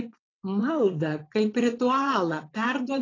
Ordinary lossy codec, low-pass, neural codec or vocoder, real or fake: AAC, 32 kbps; 7.2 kHz; vocoder, 22.05 kHz, 80 mel bands, WaveNeXt; fake